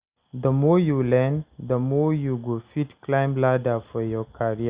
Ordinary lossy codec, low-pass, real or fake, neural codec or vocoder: Opus, 64 kbps; 3.6 kHz; real; none